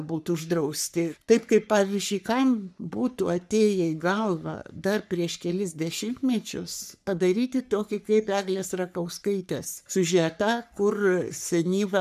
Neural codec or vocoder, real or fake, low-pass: codec, 44.1 kHz, 3.4 kbps, Pupu-Codec; fake; 14.4 kHz